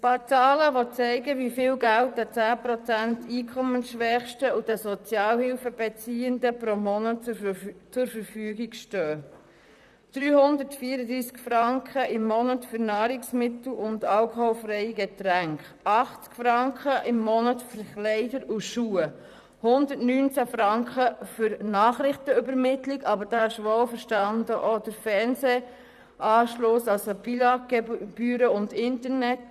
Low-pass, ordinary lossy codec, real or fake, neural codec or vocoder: 14.4 kHz; none; fake; vocoder, 44.1 kHz, 128 mel bands, Pupu-Vocoder